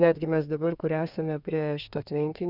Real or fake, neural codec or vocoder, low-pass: fake; codec, 32 kHz, 1.9 kbps, SNAC; 5.4 kHz